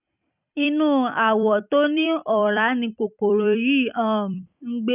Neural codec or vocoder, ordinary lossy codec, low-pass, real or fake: vocoder, 44.1 kHz, 128 mel bands every 256 samples, BigVGAN v2; none; 3.6 kHz; fake